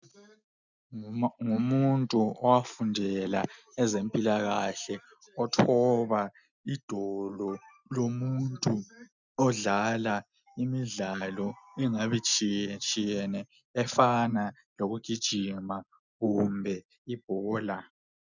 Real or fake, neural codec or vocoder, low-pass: real; none; 7.2 kHz